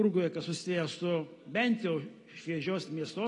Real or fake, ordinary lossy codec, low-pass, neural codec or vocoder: real; AAC, 32 kbps; 10.8 kHz; none